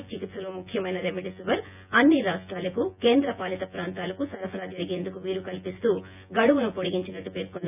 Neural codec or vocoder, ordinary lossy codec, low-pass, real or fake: vocoder, 24 kHz, 100 mel bands, Vocos; none; 3.6 kHz; fake